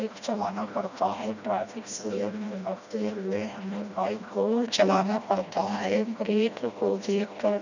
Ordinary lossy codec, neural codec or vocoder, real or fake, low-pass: none; codec, 16 kHz, 1 kbps, FreqCodec, smaller model; fake; 7.2 kHz